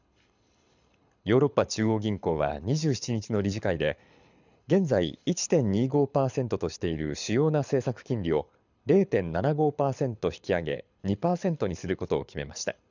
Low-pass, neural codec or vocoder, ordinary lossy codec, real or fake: 7.2 kHz; codec, 24 kHz, 6 kbps, HILCodec; none; fake